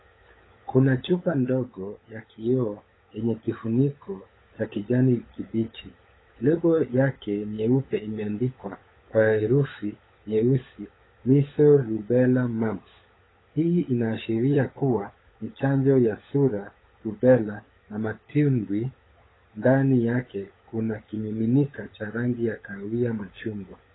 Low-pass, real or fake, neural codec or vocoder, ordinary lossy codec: 7.2 kHz; fake; codec, 16 kHz, 16 kbps, FunCodec, trained on LibriTTS, 50 frames a second; AAC, 16 kbps